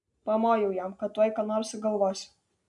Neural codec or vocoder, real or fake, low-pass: none; real; 10.8 kHz